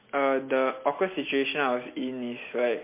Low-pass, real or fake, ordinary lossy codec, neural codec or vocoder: 3.6 kHz; real; MP3, 24 kbps; none